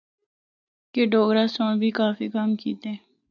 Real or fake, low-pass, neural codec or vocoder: real; 7.2 kHz; none